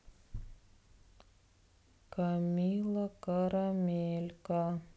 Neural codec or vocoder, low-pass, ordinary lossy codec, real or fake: none; none; none; real